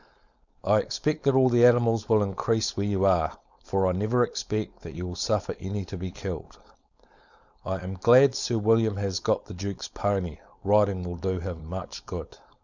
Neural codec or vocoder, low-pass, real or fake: codec, 16 kHz, 4.8 kbps, FACodec; 7.2 kHz; fake